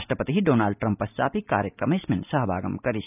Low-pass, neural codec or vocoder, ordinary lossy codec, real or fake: 3.6 kHz; none; none; real